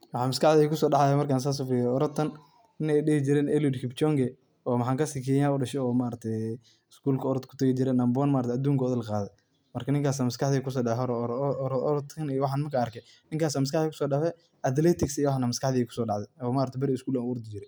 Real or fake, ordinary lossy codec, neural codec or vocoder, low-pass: real; none; none; none